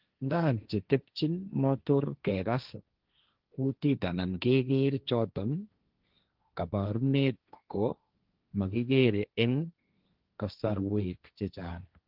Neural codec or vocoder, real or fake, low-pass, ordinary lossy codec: codec, 16 kHz, 1.1 kbps, Voila-Tokenizer; fake; 5.4 kHz; Opus, 32 kbps